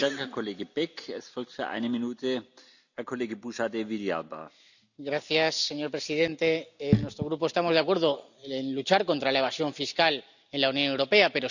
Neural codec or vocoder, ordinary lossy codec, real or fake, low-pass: none; none; real; 7.2 kHz